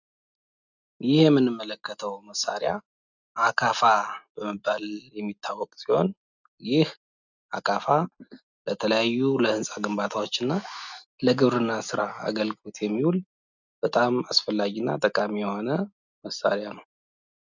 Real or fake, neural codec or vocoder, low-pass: real; none; 7.2 kHz